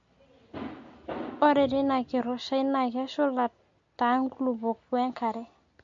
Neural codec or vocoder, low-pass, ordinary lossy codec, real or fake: none; 7.2 kHz; MP3, 48 kbps; real